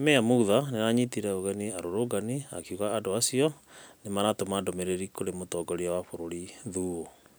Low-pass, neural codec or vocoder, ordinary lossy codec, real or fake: none; none; none; real